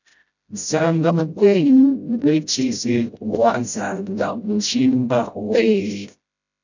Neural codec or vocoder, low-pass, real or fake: codec, 16 kHz, 0.5 kbps, FreqCodec, smaller model; 7.2 kHz; fake